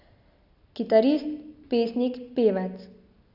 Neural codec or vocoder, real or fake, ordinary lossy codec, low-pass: none; real; none; 5.4 kHz